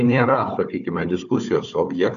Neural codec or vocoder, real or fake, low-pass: codec, 16 kHz, 4 kbps, FunCodec, trained on Chinese and English, 50 frames a second; fake; 7.2 kHz